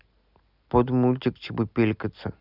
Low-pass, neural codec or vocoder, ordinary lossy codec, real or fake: 5.4 kHz; none; none; real